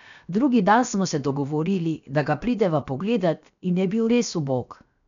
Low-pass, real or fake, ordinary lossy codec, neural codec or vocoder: 7.2 kHz; fake; none; codec, 16 kHz, 0.7 kbps, FocalCodec